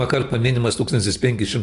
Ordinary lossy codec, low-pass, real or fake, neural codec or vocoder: AAC, 48 kbps; 10.8 kHz; real; none